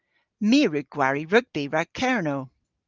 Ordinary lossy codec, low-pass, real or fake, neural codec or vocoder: Opus, 32 kbps; 7.2 kHz; real; none